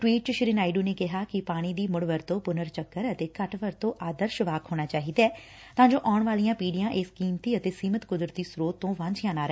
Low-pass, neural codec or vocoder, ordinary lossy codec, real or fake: none; none; none; real